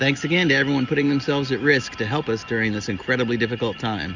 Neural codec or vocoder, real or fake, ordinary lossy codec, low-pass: none; real; Opus, 64 kbps; 7.2 kHz